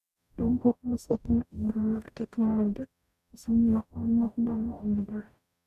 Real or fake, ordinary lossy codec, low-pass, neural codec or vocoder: fake; none; 14.4 kHz; codec, 44.1 kHz, 0.9 kbps, DAC